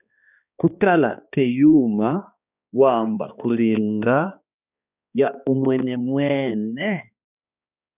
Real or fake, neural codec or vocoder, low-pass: fake; codec, 16 kHz, 2 kbps, X-Codec, HuBERT features, trained on balanced general audio; 3.6 kHz